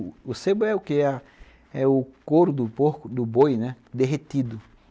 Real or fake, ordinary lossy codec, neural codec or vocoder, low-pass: real; none; none; none